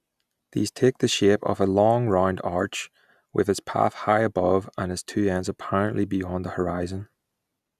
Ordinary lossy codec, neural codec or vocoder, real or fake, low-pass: none; none; real; 14.4 kHz